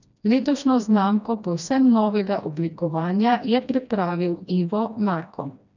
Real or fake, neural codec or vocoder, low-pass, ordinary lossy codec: fake; codec, 16 kHz, 1 kbps, FreqCodec, smaller model; 7.2 kHz; none